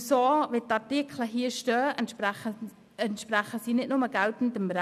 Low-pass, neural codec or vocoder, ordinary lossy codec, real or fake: 14.4 kHz; none; none; real